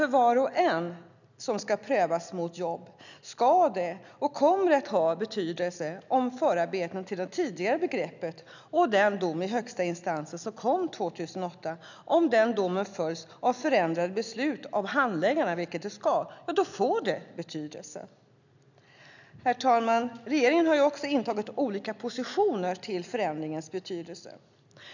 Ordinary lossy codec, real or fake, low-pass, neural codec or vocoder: none; real; 7.2 kHz; none